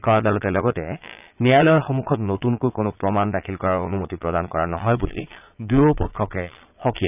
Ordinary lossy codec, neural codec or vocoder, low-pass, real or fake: none; vocoder, 22.05 kHz, 80 mel bands, Vocos; 3.6 kHz; fake